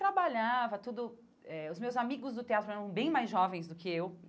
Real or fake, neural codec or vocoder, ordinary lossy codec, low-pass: real; none; none; none